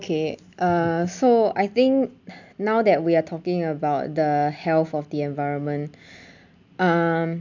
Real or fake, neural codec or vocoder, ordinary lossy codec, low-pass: real; none; none; 7.2 kHz